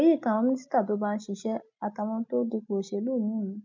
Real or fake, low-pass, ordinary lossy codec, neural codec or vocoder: real; 7.2 kHz; MP3, 64 kbps; none